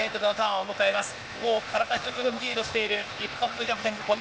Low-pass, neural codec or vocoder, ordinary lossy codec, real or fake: none; codec, 16 kHz, 0.8 kbps, ZipCodec; none; fake